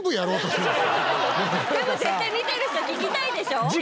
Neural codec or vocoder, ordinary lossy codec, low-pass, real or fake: none; none; none; real